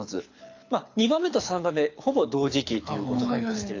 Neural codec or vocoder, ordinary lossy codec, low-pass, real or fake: codec, 24 kHz, 6 kbps, HILCodec; AAC, 48 kbps; 7.2 kHz; fake